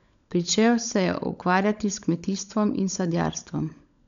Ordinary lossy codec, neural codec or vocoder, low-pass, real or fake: none; codec, 16 kHz, 16 kbps, FunCodec, trained on LibriTTS, 50 frames a second; 7.2 kHz; fake